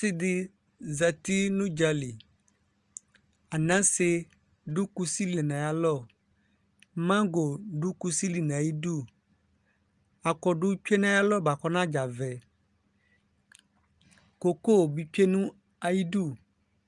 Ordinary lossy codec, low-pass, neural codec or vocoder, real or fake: Opus, 32 kbps; 10.8 kHz; none; real